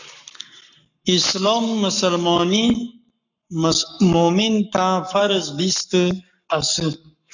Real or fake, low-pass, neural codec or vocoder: fake; 7.2 kHz; codec, 44.1 kHz, 7.8 kbps, Pupu-Codec